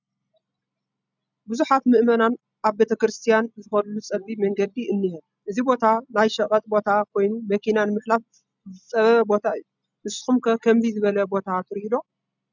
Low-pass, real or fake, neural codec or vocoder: 7.2 kHz; real; none